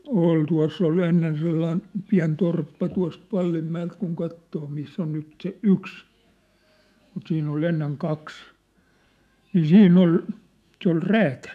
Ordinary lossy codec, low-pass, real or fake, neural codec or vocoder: none; 14.4 kHz; fake; autoencoder, 48 kHz, 128 numbers a frame, DAC-VAE, trained on Japanese speech